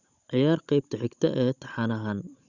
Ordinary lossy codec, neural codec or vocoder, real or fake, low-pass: Opus, 64 kbps; codec, 16 kHz, 16 kbps, FunCodec, trained on Chinese and English, 50 frames a second; fake; 7.2 kHz